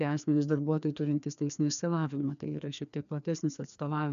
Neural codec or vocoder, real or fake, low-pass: codec, 16 kHz, 2 kbps, FreqCodec, larger model; fake; 7.2 kHz